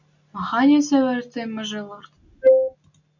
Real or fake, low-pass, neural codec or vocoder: real; 7.2 kHz; none